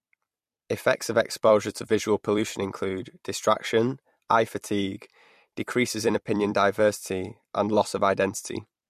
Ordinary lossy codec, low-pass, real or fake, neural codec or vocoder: MP3, 64 kbps; 14.4 kHz; fake; vocoder, 44.1 kHz, 128 mel bands every 256 samples, BigVGAN v2